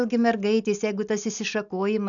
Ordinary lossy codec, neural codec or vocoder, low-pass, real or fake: MP3, 96 kbps; none; 7.2 kHz; real